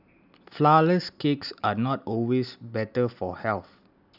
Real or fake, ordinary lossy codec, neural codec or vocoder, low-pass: real; none; none; 5.4 kHz